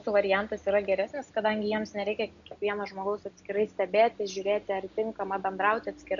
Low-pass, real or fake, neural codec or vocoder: 7.2 kHz; real; none